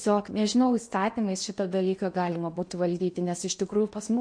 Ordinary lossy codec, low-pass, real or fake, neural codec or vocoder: MP3, 48 kbps; 9.9 kHz; fake; codec, 16 kHz in and 24 kHz out, 0.8 kbps, FocalCodec, streaming, 65536 codes